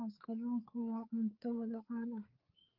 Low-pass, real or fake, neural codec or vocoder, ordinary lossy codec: 5.4 kHz; fake; codec, 16 kHz, 4 kbps, FreqCodec, larger model; Opus, 24 kbps